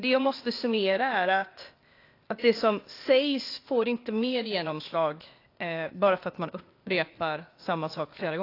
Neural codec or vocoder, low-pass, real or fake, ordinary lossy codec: codec, 16 kHz, 0.8 kbps, ZipCodec; 5.4 kHz; fake; AAC, 32 kbps